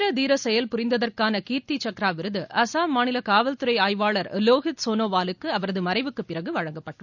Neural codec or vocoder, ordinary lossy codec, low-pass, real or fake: none; none; 7.2 kHz; real